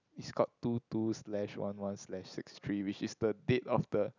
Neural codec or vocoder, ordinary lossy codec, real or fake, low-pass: none; none; real; 7.2 kHz